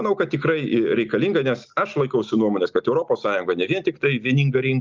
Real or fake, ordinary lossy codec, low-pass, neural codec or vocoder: real; Opus, 24 kbps; 7.2 kHz; none